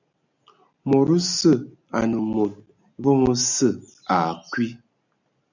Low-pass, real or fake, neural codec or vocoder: 7.2 kHz; real; none